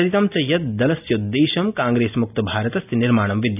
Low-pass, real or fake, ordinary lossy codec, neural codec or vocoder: 3.6 kHz; real; none; none